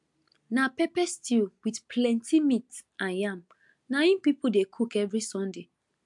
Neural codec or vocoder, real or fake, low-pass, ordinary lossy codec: none; real; 10.8 kHz; MP3, 64 kbps